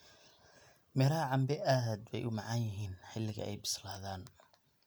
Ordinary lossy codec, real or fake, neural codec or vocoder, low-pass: none; real; none; none